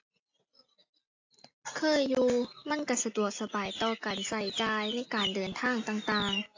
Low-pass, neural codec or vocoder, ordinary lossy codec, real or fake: 7.2 kHz; none; AAC, 48 kbps; real